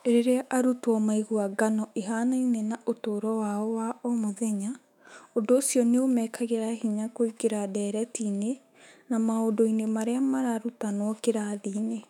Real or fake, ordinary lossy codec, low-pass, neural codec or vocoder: fake; none; 19.8 kHz; autoencoder, 48 kHz, 128 numbers a frame, DAC-VAE, trained on Japanese speech